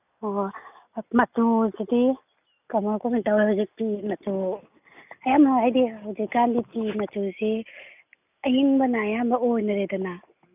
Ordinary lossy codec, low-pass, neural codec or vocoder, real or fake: none; 3.6 kHz; none; real